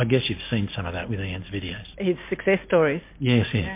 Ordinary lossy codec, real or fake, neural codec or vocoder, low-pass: MP3, 24 kbps; real; none; 3.6 kHz